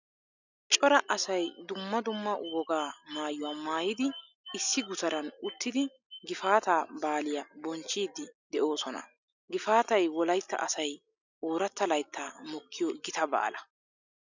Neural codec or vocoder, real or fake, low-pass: none; real; 7.2 kHz